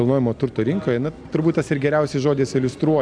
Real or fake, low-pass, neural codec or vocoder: real; 9.9 kHz; none